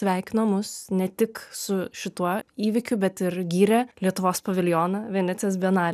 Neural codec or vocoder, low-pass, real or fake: none; 14.4 kHz; real